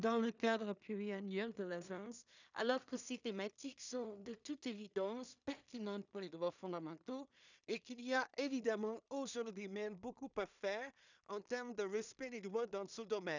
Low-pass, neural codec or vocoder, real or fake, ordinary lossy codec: 7.2 kHz; codec, 16 kHz in and 24 kHz out, 0.4 kbps, LongCat-Audio-Codec, two codebook decoder; fake; none